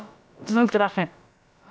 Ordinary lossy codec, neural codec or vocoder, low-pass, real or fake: none; codec, 16 kHz, about 1 kbps, DyCAST, with the encoder's durations; none; fake